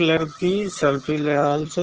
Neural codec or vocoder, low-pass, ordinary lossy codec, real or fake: vocoder, 22.05 kHz, 80 mel bands, HiFi-GAN; 7.2 kHz; Opus, 16 kbps; fake